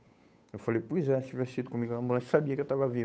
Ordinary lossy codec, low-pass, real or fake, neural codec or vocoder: none; none; fake; codec, 16 kHz, 8 kbps, FunCodec, trained on Chinese and English, 25 frames a second